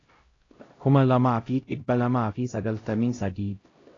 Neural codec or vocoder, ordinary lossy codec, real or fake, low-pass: codec, 16 kHz, 0.5 kbps, X-Codec, HuBERT features, trained on LibriSpeech; AAC, 32 kbps; fake; 7.2 kHz